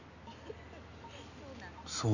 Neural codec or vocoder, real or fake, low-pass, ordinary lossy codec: none; real; 7.2 kHz; none